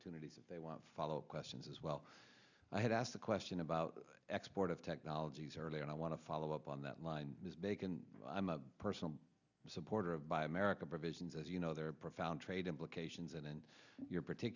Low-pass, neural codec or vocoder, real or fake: 7.2 kHz; none; real